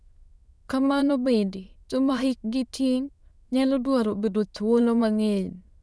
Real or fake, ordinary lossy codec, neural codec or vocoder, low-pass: fake; none; autoencoder, 22.05 kHz, a latent of 192 numbers a frame, VITS, trained on many speakers; none